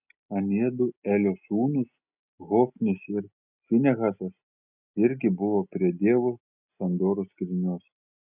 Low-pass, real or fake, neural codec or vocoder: 3.6 kHz; real; none